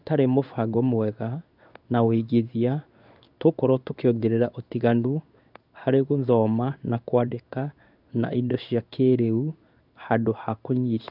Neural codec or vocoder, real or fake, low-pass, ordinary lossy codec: codec, 16 kHz in and 24 kHz out, 1 kbps, XY-Tokenizer; fake; 5.4 kHz; none